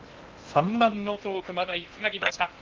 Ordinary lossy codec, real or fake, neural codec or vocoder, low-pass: Opus, 32 kbps; fake; codec, 16 kHz in and 24 kHz out, 0.6 kbps, FocalCodec, streaming, 2048 codes; 7.2 kHz